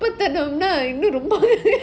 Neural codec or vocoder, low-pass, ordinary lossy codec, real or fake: none; none; none; real